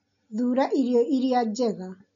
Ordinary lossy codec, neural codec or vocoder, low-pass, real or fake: none; none; 7.2 kHz; real